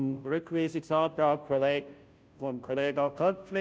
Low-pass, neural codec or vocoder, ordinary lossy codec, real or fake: none; codec, 16 kHz, 0.5 kbps, FunCodec, trained on Chinese and English, 25 frames a second; none; fake